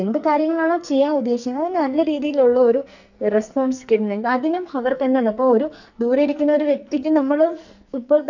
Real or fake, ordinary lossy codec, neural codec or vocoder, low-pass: fake; none; codec, 44.1 kHz, 2.6 kbps, SNAC; 7.2 kHz